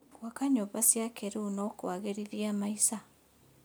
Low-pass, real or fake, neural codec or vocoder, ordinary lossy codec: none; real; none; none